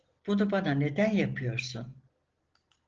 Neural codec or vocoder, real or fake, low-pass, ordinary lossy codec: none; real; 7.2 kHz; Opus, 16 kbps